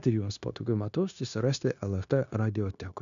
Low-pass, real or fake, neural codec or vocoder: 7.2 kHz; fake; codec, 16 kHz, 0.9 kbps, LongCat-Audio-Codec